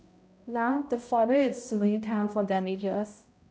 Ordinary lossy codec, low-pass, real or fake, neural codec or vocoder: none; none; fake; codec, 16 kHz, 0.5 kbps, X-Codec, HuBERT features, trained on balanced general audio